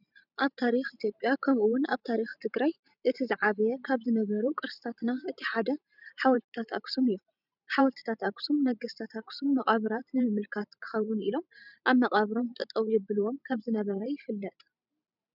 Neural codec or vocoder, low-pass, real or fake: vocoder, 44.1 kHz, 128 mel bands every 512 samples, BigVGAN v2; 5.4 kHz; fake